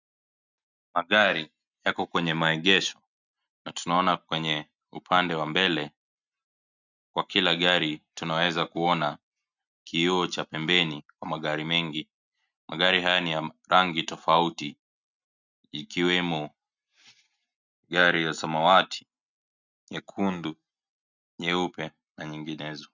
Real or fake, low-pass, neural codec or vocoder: real; 7.2 kHz; none